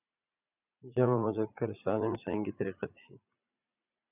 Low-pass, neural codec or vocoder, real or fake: 3.6 kHz; vocoder, 44.1 kHz, 80 mel bands, Vocos; fake